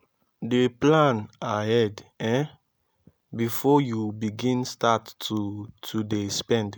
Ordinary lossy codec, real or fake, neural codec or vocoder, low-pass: none; real; none; none